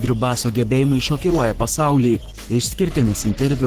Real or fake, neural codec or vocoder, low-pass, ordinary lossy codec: fake; codec, 44.1 kHz, 3.4 kbps, Pupu-Codec; 14.4 kHz; Opus, 16 kbps